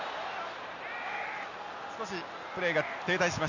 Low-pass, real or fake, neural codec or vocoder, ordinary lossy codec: 7.2 kHz; real; none; none